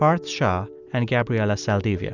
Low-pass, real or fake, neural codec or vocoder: 7.2 kHz; real; none